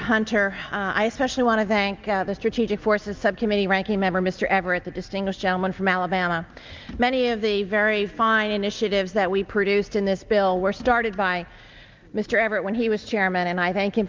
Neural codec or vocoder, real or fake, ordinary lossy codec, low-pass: none; real; Opus, 32 kbps; 7.2 kHz